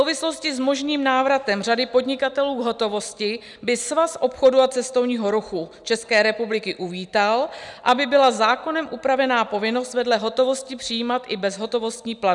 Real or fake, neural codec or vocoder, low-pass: real; none; 10.8 kHz